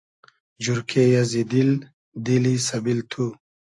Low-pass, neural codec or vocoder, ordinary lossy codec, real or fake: 10.8 kHz; none; AAC, 64 kbps; real